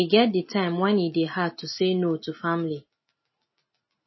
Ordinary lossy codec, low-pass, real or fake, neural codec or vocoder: MP3, 24 kbps; 7.2 kHz; real; none